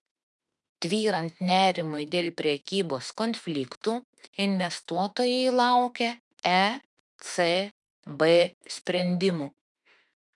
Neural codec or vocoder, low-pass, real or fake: autoencoder, 48 kHz, 32 numbers a frame, DAC-VAE, trained on Japanese speech; 10.8 kHz; fake